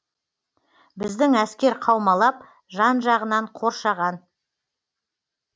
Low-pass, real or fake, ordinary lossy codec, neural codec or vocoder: none; real; none; none